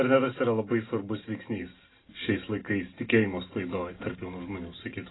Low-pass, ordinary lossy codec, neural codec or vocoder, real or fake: 7.2 kHz; AAC, 16 kbps; none; real